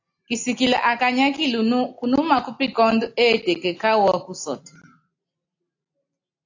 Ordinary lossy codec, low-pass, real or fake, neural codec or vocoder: AAC, 48 kbps; 7.2 kHz; real; none